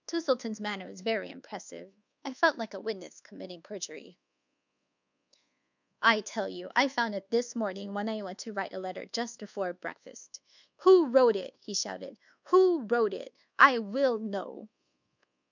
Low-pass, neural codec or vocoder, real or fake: 7.2 kHz; codec, 24 kHz, 1.2 kbps, DualCodec; fake